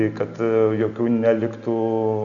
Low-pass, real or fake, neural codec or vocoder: 7.2 kHz; real; none